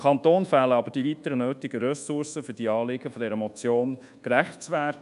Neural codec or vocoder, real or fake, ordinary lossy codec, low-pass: codec, 24 kHz, 1.2 kbps, DualCodec; fake; none; 10.8 kHz